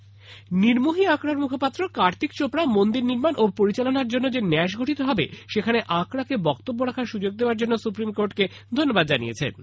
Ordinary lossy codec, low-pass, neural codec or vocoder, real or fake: none; none; none; real